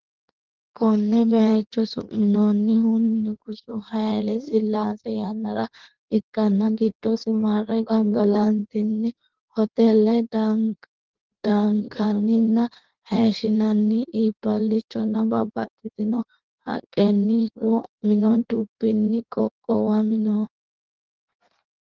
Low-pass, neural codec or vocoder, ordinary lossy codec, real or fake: 7.2 kHz; codec, 16 kHz in and 24 kHz out, 1.1 kbps, FireRedTTS-2 codec; Opus, 16 kbps; fake